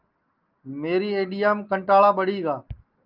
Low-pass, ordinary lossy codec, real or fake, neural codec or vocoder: 5.4 kHz; Opus, 24 kbps; real; none